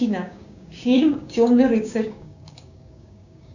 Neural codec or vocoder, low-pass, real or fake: codec, 16 kHz, 6 kbps, DAC; 7.2 kHz; fake